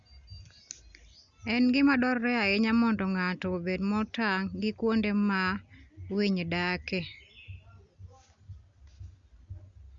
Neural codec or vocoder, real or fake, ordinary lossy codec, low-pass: none; real; Opus, 64 kbps; 7.2 kHz